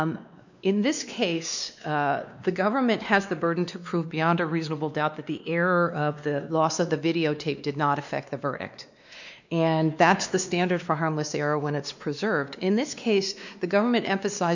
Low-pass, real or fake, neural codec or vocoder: 7.2 kHz; fake; codec, 16 kHz, 2 kbps, X-Codec, WavLM features, trained on Multilingual LibriSpeech